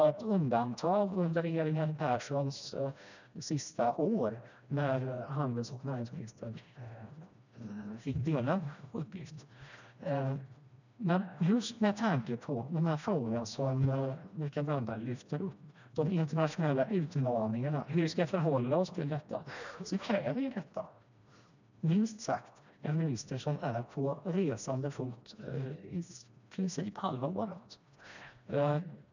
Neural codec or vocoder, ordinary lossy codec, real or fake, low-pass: codec, 16 kHz, 1 kbps, FreqCodec, smaller model; none; fake; 7.2 kHz